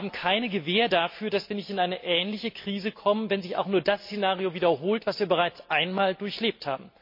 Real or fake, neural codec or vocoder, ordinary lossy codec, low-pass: real; none; MP3, 32 kbps; 5.4 kHz